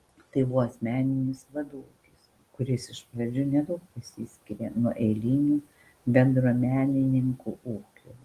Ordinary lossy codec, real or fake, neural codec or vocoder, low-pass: Opus, 24 kbps; real; none; 14.4 kHz